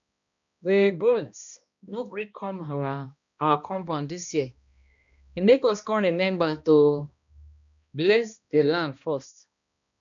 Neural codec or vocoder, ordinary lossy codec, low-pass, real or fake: codec, 16 kHz, 1 kbps, X-Codec, HuBERT features, trained on balanced general audio; MP3, 96 kbps; 7.2 kHz; fake